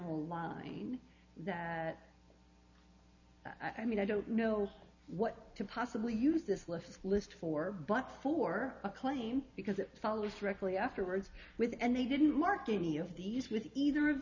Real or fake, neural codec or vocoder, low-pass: real; none; 7.2 kHz